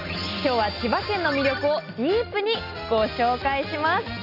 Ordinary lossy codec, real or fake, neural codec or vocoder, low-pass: none; real; none; 5.4 kHz